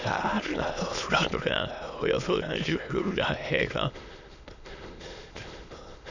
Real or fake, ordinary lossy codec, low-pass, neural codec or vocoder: fake; none; 7.2 kHz; autoencoder, 22.05 kHz, a latent of 192 numbers a frame, VITS, trained on many speakers